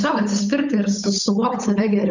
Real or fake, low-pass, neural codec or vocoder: fake; 7.2 kHz; codec, 16 kHz, 8 kbps, FunCodec, trained on Chinese and English, 25 frames a second